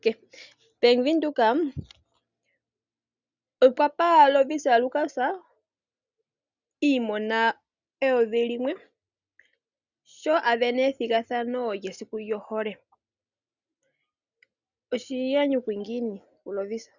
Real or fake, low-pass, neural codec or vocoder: real; 7.2 kHz; none